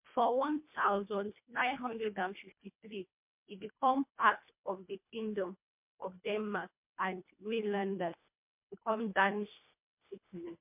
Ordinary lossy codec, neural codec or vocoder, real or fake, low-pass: MP3, 32 kbps; codec, 24 kHz, 1.5 kbps, HILCodec; fake; 3.6 kHz